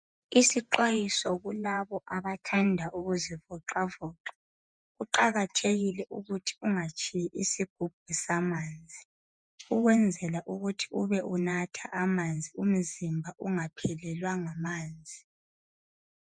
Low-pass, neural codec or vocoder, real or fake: 9.9 kHz; vocoder, 48 kHz, 128 mel bands, Vocos; fake